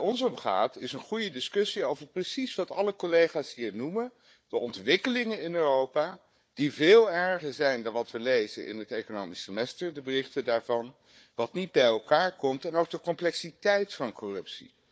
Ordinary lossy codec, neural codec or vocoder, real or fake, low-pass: none; codec, 16 kHz, 4 kbps, FunCodec, trained on Chinese and English, 50 frames a second; fake; none